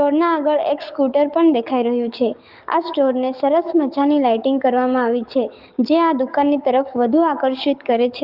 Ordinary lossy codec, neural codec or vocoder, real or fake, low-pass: Opus, 32 kbps; codec, 44.1 kHz, 7.8 kbps, DAC; fake; 5.4 kHz